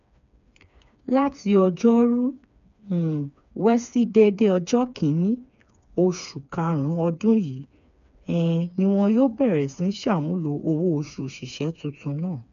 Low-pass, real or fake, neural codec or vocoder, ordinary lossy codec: 7.2 kHz; fake; codec, 16 kHz, 4 kbps, FreqCodec, smaller model; none